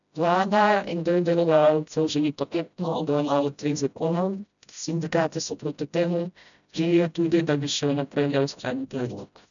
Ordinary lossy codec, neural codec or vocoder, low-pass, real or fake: none; codec, 16 kHz, 0.5 kbps, FreqCodec, smaller model; 7.2 kHz; fake